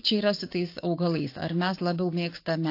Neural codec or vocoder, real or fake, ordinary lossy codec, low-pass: codec, 16 kHz, 6 kbps, DAC; fake; AAC, 32 kbps; 5.4 kHz